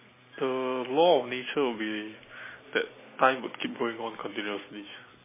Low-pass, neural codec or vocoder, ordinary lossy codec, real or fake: 3.6 kHz; none; MP3, 16 kbps; real